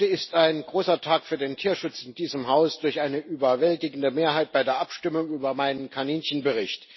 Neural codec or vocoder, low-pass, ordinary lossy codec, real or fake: none; 7.2 kHz; MP3, 24 kbps; real